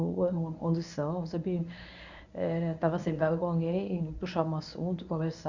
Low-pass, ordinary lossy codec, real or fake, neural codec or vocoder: 7.2 kHz; none; fake; codec, 24 kHz, 0.9 kbps, WavTokenizer, medium speech release version 1